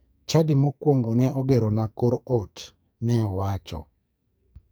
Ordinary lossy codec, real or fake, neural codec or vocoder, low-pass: none; fake; codec, 44.1 kHz, 2.6 kbps, SNAC; none